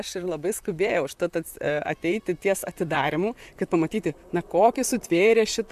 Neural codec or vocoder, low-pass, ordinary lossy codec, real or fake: vocoder, 44.1 kHz, 128 mel bands, Pupu-Vocoder; 14.4 kHz; MP3, 96 kbps; fake